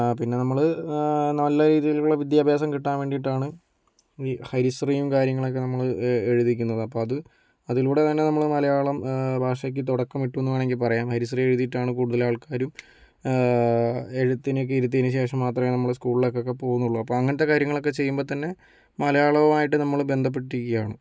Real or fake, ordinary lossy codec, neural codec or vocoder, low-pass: real; none; none; none